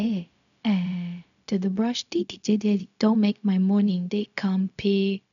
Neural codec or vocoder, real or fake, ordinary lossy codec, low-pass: codec, 16 kHz, 0.4 kbps, LongCat-Audio-Codec; fake; none; 7.2 kHz